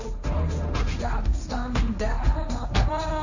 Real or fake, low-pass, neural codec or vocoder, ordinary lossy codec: fake; 7.2 kHz; codec, 16 kHz, 1.1 kbps, Voila-Tokenizer; none